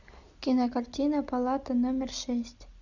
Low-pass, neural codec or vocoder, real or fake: 7.2 kHz; none; real